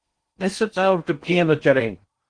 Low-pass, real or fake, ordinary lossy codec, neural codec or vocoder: 9.9 kHz; fake; Opus, 24 kbps; codec, 16 kHz in and 24 kHz out, 0.6 kbps, FocalCodec, streaming, 2048 codes